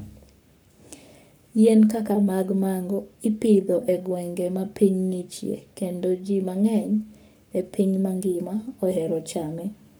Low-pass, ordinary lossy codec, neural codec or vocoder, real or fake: none; none; codec, 44.1 kHz, 7.8 kbps, Pupu-Codec; fake